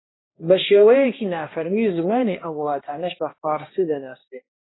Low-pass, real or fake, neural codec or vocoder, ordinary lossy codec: 7.2 kHz; fake; codec, 16 kHz, 1 kbps, X-Codec, HuBERT features, trained on balanced general audio; AAC, 16 kbps